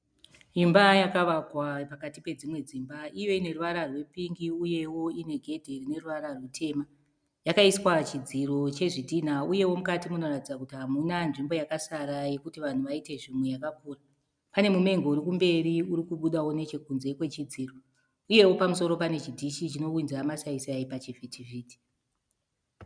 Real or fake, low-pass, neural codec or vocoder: real; 9.9 kHz; none